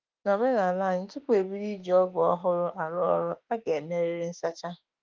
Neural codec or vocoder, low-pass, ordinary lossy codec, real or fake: autoencoder, 48 kHz, 32 numbers a frame, DAC-VAE, trained on Japanese speech; 7.2 kHz; Opus, 32 kbps; fake